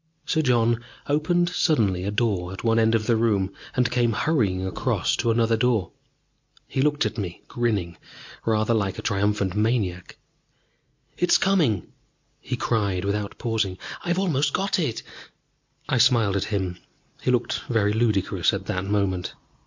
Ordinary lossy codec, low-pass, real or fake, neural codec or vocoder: MP3, 48 kbps; 7.2 kHz; real; none